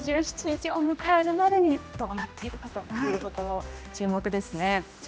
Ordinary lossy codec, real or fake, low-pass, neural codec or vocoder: none; fake; none; codec, 16 kHz, 1 kbps, X-Codec, HuBERT features, trained on general audio